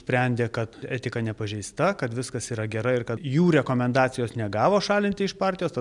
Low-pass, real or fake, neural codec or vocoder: 10.8 kHz; real; none